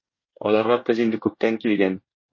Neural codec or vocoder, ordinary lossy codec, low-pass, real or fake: codec, 24 kHz, 1 kbps, SNAC; MP3, 32 kbps; 7.2 kHz; fake